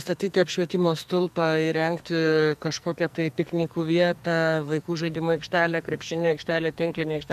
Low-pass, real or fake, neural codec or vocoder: 14.4 kHz; fake; codec, 44.1 kHz, 2.6 kbps, SNAC